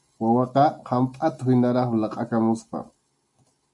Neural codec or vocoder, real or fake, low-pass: none; real; 10.8 kHz